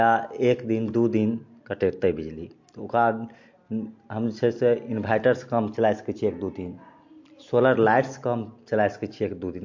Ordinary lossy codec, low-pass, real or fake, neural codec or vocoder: MP3, 48 kbps; 7.2 kHz; real; none